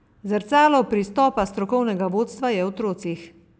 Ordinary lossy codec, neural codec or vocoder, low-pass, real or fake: none; none; none; real